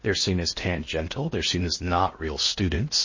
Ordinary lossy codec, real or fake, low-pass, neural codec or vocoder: MP3, 32 kbps; fake; 7.2 kHz; codec, 16 kHz in and 24 kHz out, 0.6 kbps, FocalCodec, streaming, 4096 codes